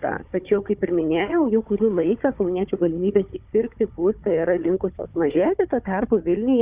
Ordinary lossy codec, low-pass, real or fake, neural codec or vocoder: Opus, 64 kbps; 3.6 kHz; fake; codec, 16 kHz, 4 kbps, FunCodec, trained on Chinese and English, 50 frames a second